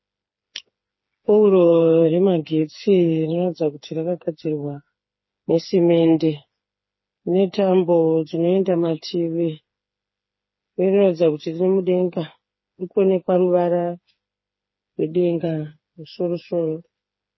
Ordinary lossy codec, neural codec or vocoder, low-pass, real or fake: MP3, 24 kbps; codec, 16 kHz, 4 kbps, FreqCodec, smaller model; 7.2 kHz; fake